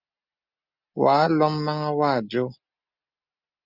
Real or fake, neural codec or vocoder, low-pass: real; none; 5.4 kHz